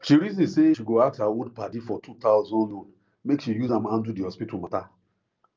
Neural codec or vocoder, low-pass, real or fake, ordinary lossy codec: none; 7.2 kHz; real; Opus, 24 kbps